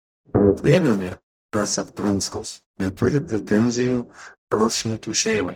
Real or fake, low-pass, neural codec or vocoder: fake; 19.8 kHz; codec, 44.1 kHz, 0.9 kbps, DAC